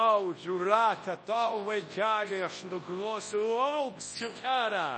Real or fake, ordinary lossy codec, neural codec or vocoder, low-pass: fake; MP3, 32 kbps; codec, 24 kHz, 0.9 kbps, WavTokenizer, large speech release; 9.9 kHz